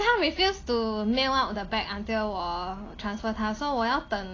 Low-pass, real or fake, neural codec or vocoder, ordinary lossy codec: 7.2 kHz; real; none; AAC, 32 kbps